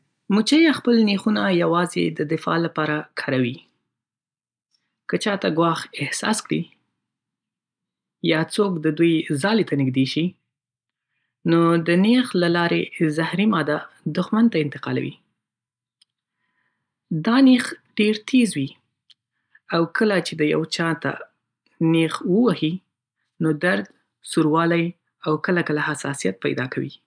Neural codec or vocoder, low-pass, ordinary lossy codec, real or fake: none; 9.9 kHz; none; real